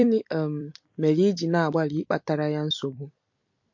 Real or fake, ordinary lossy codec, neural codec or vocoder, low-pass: fake; MP3, 48 kbps; vocoder, 44.1 kHz, 128 mel bands every 256 samples, BigVGAN v2; 7.2 kHz